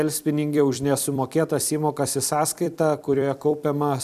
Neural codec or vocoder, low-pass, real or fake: vocoder, 44.1 kHz, 128 mel bands every 256 samples, BigVGAN v2; 14.4 kHz; fake